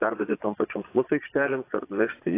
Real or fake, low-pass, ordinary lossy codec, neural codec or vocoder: fake; 3.6 kHz; AAC, 24 kbps; vocoder, 22.05 kHz, 80 mel bands, Vocos